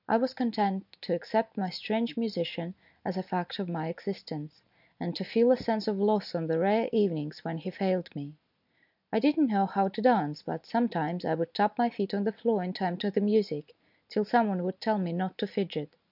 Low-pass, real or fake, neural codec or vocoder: 5.4 kHz; real; none